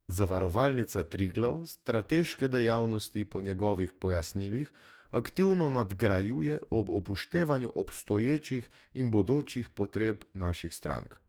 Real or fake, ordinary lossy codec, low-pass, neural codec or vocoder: fake; none; none; codec, 44.1 kHz, 2.6 kbps, DAC